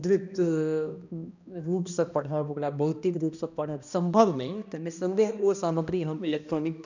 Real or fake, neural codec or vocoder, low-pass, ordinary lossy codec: fake; codec, 16 kHz, 1 kbps, X-Codec, HuBERT features, trained on balanced general audio; 7.2 kHz; none